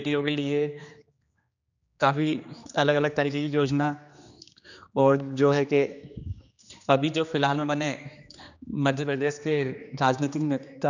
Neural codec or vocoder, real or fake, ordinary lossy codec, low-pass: codec, 16 kHz, 2 kbps, X-Codec, HuBERT features, trained on general audio; fake; none; 7.2 kHz